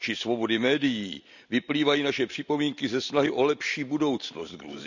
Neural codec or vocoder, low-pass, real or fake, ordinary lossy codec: none; 7.2 kHz; real; none